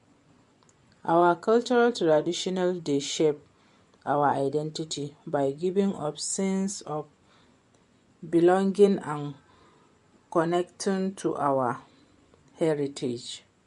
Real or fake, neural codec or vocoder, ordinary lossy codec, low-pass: fake; vocoder, 24 kHz, 100 mel bands, Vocos; MP3, 64 kbps; 10.8 kHz